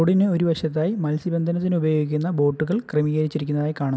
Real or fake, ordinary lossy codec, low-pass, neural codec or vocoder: real; none; none; none